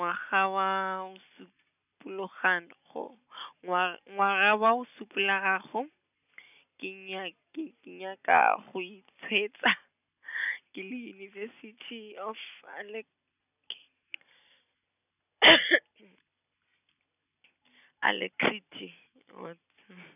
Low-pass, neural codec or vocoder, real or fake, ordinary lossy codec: 3.6 kHz; none; real; none